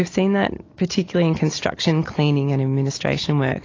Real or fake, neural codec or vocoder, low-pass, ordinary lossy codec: real; none; 7.2 kHz; AAC, 32 kbps